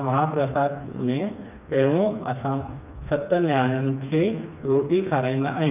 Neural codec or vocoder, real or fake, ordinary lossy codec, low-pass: codec, 16 kHz, 2 kbps, FreqCodec, smaller model; fake; none; 3.6 kHz